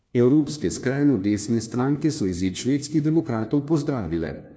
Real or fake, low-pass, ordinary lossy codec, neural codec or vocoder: fake; none; none; codec, 16 kHz, 1 kbps, FunCodec, trained on LibriTTS, 50 frames a second